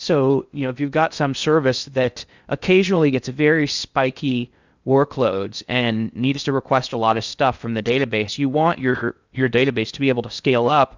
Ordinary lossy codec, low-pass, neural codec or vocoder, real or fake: Opus, 64 kbps; 7.2 kHz; codec, 16 kHz in and 24 kHz out, 0.6 kbps, FocalCodec, streaming, 2048 codes; fake